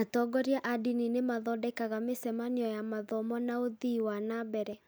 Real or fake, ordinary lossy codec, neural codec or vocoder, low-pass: real; none; none; none